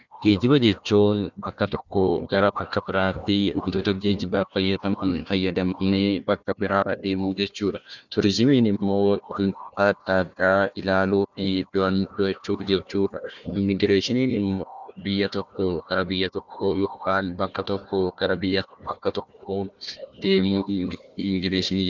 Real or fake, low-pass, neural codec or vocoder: fake; 7.2 kHz; codec, 16 kHz, 1 kbps, FunCodec, trained on Chinese and English, 50 frames a second